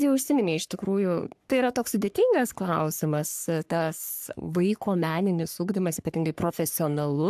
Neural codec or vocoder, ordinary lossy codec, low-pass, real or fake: codec, 44.1 kHz, 3.4 kbps, Pupu-Codec; AAC, 96 kbps; 14.4 kHz; fake